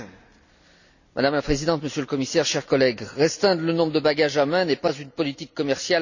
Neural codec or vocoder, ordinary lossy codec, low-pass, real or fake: none; none; 7.2 kHz; real